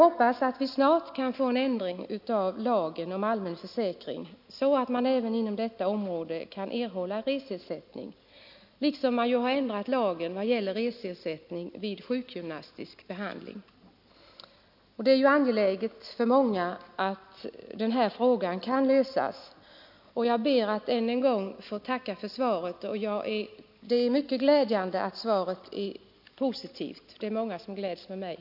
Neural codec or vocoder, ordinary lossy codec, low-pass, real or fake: none; none; 5.4 kHz; real